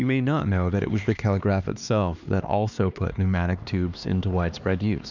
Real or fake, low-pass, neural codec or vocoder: fake; 7.2 kHz; codec, 16 kHz, 2 kbps, X-Codec, HuBERT features, trained on LibriSpeech